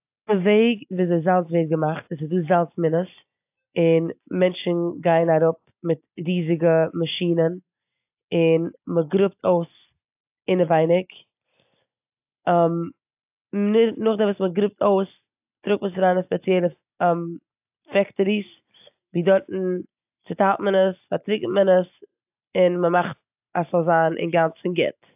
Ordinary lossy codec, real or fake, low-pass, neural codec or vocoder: AAC, 32 kbps; real; 3.6 kHz; none